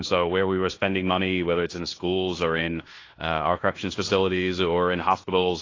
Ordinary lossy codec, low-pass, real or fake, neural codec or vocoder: AAC, 32 kbps; 7.2 kHz; fake; codec, 16 kHz in and 24 kHz out, 0.9 kbps, LongCat-Audio-Codec, fine tuned four codebook decoder